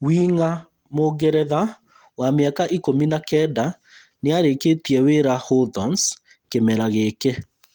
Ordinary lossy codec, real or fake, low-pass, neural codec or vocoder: Opus, 24 kbps; real; 19.8 kHz; none